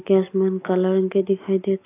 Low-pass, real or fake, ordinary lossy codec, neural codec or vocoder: 3.6 kHz; real; AAC, 24 kbps; none